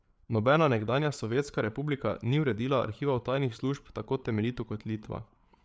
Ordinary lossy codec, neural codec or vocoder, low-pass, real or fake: none; codec, 16 kHz, 8 kbps, FreqCodec, larger model; none; fake